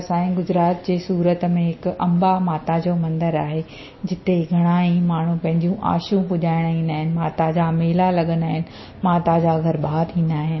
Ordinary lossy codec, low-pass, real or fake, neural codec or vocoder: MP3, 24 kbps; 7.2 kHz; real; none